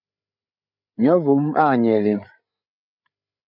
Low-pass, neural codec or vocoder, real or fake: 5.4 kHz; codec, 16 kHz, 8 kbps, FreqCodec, larger model; fake